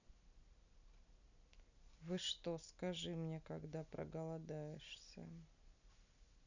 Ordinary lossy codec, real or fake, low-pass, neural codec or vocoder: none; real; 7.2 kHz; none